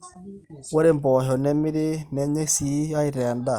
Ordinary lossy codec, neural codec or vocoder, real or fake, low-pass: Opus, 32 kbps; none; real; 19.8 kHz